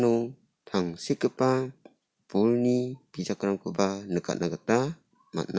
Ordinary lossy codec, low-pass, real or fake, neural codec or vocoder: none; none; real; none